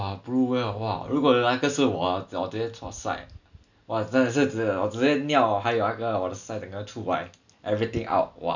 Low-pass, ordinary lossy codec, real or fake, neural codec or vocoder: 7.2 kHz; none; real; none